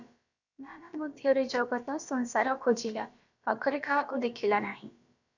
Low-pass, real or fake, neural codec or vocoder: 7.2 kHz; fake; codec, 16 kHz, about 1 kbps, DyCAST, with the encoder's durations